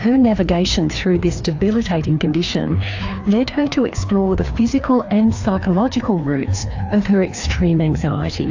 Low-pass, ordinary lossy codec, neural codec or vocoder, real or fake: 7.2 kHz; AAC, 48 kbps; codec, 16 kHz, 2 kbps, FreqCodec, larger model; fake